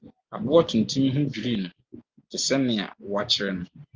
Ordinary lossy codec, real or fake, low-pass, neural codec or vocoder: Opus, 32 kbps; real; 7.2 kHz; none